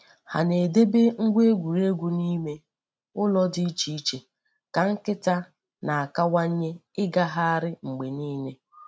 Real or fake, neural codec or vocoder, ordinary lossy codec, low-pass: real; none; none; none